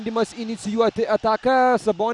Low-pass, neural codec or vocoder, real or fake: 10.8 kHz; none; real